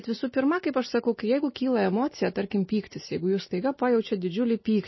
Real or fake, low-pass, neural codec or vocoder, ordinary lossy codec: real; 7.2 kHz; none; MP3, 24 kbps